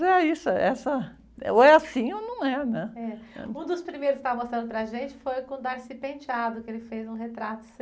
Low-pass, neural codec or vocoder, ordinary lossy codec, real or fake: none; none; none; real